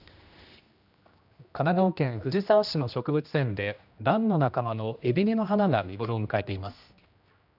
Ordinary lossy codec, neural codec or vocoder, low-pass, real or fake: none; codec, 16 kHz, 1 kbps, X-Codec, HuBERT features, trained on general audio; 5.4 kHz; fake